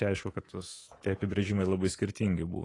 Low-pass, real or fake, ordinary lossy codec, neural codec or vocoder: 10.8 kHz; fake; AAC, 32 kbps; codec, 24 kHz, 3.1 kbps, DualCodec